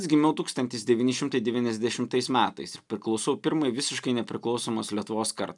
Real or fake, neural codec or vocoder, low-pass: real; none; 10.8 kHz